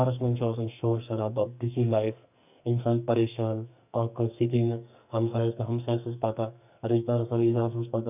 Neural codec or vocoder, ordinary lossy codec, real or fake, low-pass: codec, 44.1 kHz, 2.6 kbps, SNAC; none; fake; 3.6 kHz